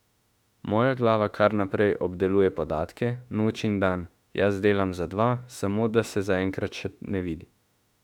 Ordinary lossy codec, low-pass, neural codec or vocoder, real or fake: none; 19.8 kHz; autoencoder, 48 kHz, 32 numbers a frame, DAC-VAE, trained on Japanese speech; fake